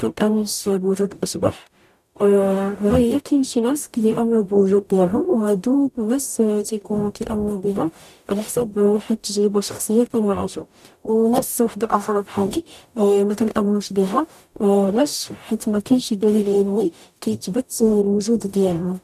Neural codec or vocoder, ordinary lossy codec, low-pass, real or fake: codec, 44.1 kHz, 0.9 kbps, DAC; none; 14.4 kHz; fake